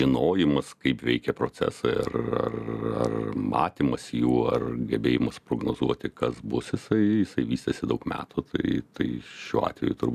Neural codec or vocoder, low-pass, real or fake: none; 14.4 kHz; real